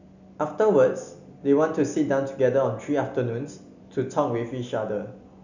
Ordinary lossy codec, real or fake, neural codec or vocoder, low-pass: none; real; none; 7.2 kHz